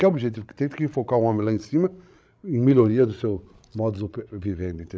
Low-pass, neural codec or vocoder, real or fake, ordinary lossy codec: none; codec, 16 kHz, 16 kbps, FreqCodec, larger model; fake; none